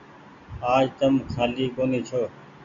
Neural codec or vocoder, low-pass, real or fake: none; 7.2 kHz; real